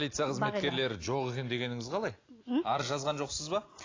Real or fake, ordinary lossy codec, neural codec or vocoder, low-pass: real; AAC, 32 kbps; none; 7.2 kHz